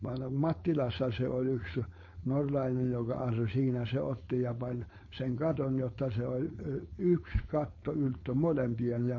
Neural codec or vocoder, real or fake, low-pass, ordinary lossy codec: codec, 16 kHz, 4.8 kbps, FACodec; fake; 7.2 kHz; MP3, 32 kbps